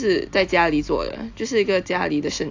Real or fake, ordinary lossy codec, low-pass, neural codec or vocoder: real; AAC, 48 kbps; 7.2 kHz; none